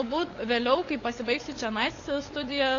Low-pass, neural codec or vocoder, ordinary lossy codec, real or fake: 7.2 kHz; codec, 16 kHz, 8 kbps, FunCodec, trained on LibriTTS, 25 frames a second; AAC, 32 kbps; fake